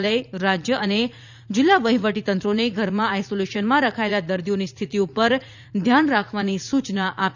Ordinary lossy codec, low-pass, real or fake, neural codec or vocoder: none; 7.2 kHz; fake; vocoder, 44.1 kHz, 80 mel bands, Vocos